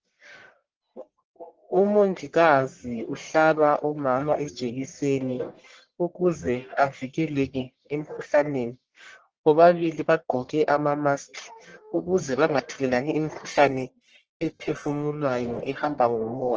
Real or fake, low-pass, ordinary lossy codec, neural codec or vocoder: fake; 7.2 kHz; Opus, 16 kbps; codec, 44.1 kHz, 1.7 kbps, Pupu-Codec